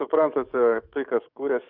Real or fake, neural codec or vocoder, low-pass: fake; codec, 44.1 kHz, 7.8 kbps, DAC; 5.4 kHz